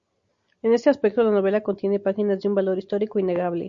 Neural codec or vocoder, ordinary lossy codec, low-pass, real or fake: none; Opus, 64 kbps; 7.2 kHz; real